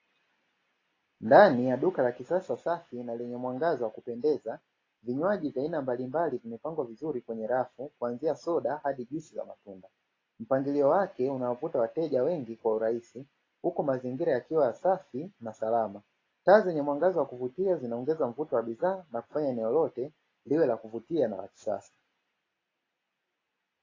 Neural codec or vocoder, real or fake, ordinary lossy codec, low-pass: none; real; AAC, 32 kbps; 7.2 kHz